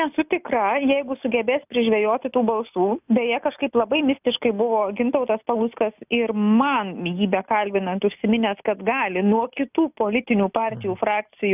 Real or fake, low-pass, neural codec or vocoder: real; 3.6 kHz; none